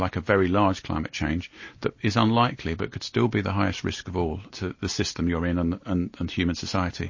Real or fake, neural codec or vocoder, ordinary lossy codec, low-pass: real; none; MP3, 32 kbps; 7.2 kHz